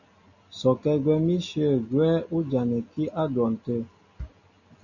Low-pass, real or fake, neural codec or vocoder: 7.2 kHz; real; none